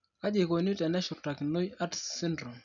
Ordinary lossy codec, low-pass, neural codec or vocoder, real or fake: none; 7.2 kHz; none; real